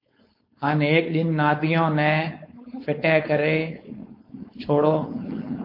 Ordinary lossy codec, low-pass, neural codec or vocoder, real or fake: MP3, 32 kbps; 5.4 kHz; codec, 16 kHz, 4.8 kbps, FACodec; fake